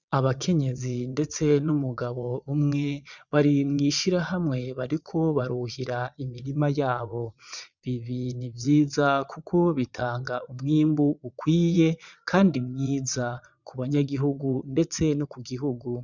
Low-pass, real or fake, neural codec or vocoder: 7.2 kHz; fake; vocoder, 22.05 kHz, 80 mel bands, Vocos